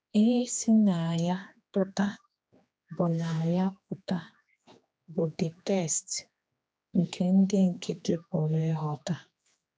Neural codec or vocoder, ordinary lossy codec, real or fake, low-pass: codec, 16 kHz, 2 kbps, X-Codec, HuBERT features, trained on general audio; none; fake; none